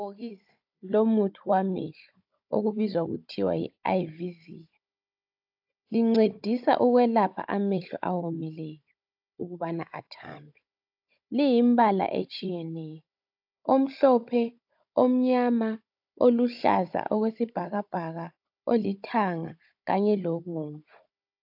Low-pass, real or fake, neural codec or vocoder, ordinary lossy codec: 5.4 kHz; fake; codec, 16 kHz, 16 kbps, FunCodec, trained on Chinese and English, 50 frames a second; AAC, 48 kbps